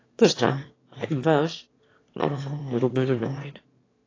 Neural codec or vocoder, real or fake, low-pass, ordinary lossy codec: autoencoder, 22.05 kHz, a latent of 192 numbers a frame, VITS, trained on one speaker; fake; 7.2 kHz; AAC, 32 kbps